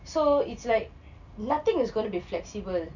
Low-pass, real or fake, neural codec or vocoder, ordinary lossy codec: 7.2 kHz; real; none; none